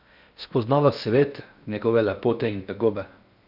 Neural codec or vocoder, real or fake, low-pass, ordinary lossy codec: codec, 16 kHz in and 24 kHz out, 0.6 kbps, FocalCodec, streaming, 4096 codes; fake; 5.4 kHz; none